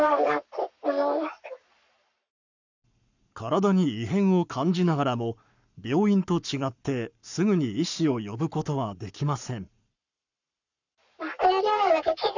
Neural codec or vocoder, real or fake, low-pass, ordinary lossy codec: codec, 44.1 kHz, 7.8 kbps, Pupu-Codec; fake; 7.2 kHz; none